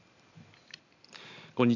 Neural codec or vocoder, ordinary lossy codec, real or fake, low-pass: none; none; real; 7.2 kHz